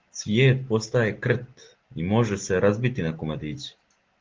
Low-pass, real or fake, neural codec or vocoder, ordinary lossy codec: 7.2 kHz; real; none; Opus, 16 kbps